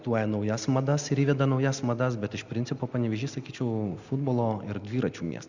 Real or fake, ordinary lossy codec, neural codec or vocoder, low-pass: real; Opus, 64 kbps; none; 7.2 kHz